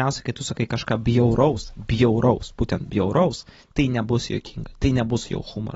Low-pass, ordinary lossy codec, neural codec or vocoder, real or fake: 19.8 kHz; AAC, 24 kbps; none; real